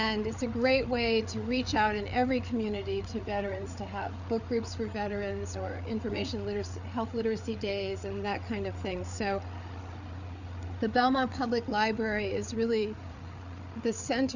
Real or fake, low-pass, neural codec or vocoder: fake; 7.2 kHz; codec, 16 kHz, 8 kbps, FreqCodec, larger model